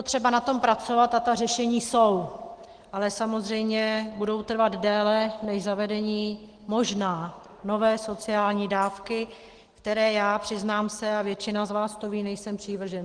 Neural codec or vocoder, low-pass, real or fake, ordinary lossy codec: none; 9.9 kHz; real; Opus, 16 kbps